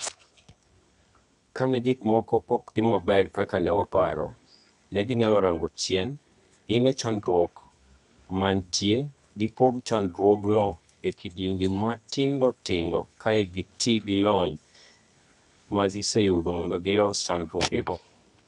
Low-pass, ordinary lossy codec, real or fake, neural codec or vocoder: 10.8 kHz; none; fake; codec, 24 kHz, 0.9 kbps, WavTokenizer, medium music audio release